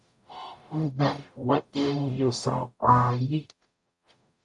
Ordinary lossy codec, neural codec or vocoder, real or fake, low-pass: AAC, 64 kbps; codec, 44.1 kHz, 0.9 kbps, DAC; fake; 10.8 kHz